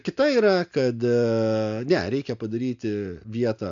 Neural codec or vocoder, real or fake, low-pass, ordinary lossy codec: none; real; 7.2 kHz; AAC, 64 kbps